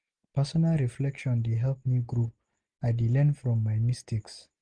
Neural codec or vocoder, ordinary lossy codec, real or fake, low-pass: none; Opus, 32 kbps; real; 9.9 kHz